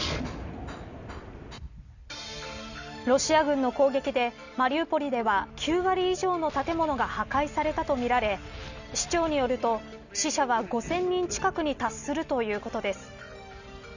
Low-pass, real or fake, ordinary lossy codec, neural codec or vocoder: 7.2 kHz; real; none; none